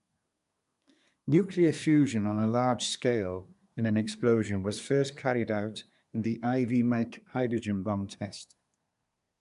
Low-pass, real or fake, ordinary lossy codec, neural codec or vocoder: 10.8 kHz; fake; none; codec, 24 kHz, 1 kbps, SNAC